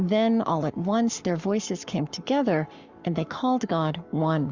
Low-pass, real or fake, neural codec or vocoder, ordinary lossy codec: 7.2 kHz; fake; codec, 44.1 kHz, 7.8 kbps, Pupu-Codec; Opus, 64 kbps